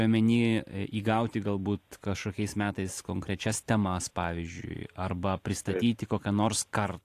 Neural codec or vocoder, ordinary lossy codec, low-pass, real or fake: vocoder, 44.1 kHz, 128 mel bands every 512 samples, BigVGAN v2; AAC, 64 kbps; 14.4 kHz; fake